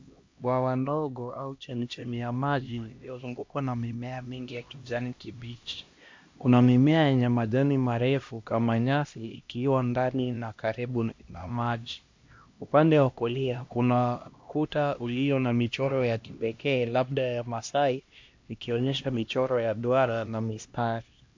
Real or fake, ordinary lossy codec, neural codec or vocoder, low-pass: fake; MP3, 48 kbps; codec, 16 kHz, 1 kbps, X-Codec, HuBERT features, trained on LibriSpeech; 7.2 kHz